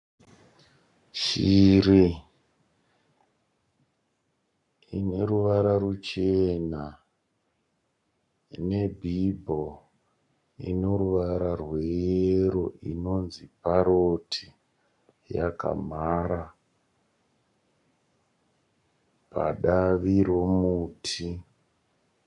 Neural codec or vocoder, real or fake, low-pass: codec, 44.1 kHz, 7.8 kbps, Pupu-Codec; fake; 10.8 kHz